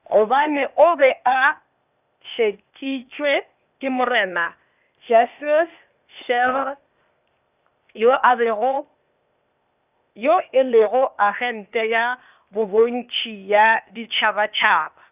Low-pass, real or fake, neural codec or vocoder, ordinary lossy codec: 3.6 kHz; fake; codec, 16 kHz, 0.8 kbps, ZipCodec; none